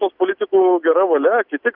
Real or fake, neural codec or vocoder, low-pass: real; none; 5.4 kHz